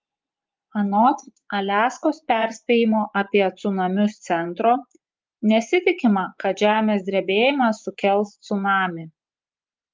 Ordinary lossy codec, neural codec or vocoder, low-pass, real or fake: Opus, 24 kbps; vocoder, 24 kHz, 100 mel bands, Vocos; 7.2 kHz; fake